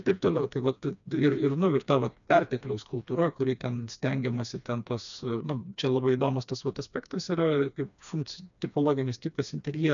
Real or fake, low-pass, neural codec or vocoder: fake; 7.2 kHz; codec, 16 kHz, 2 kbps, FreqCodec, smaller model